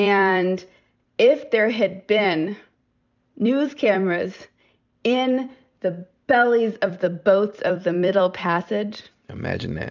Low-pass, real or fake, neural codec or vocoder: 7.2 kHz; fake; vocoder, 44.1 kHz, 128 mel bands every 256 samples, BigVGAN v2